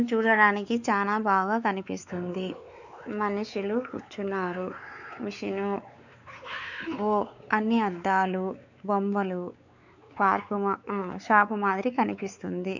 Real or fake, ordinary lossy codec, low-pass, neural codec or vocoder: fake; none; 7.2 kHz; codec, 16 kHz, 4 kbps, X-Codec, WavLM features, trained on Multilingual LibriSpeech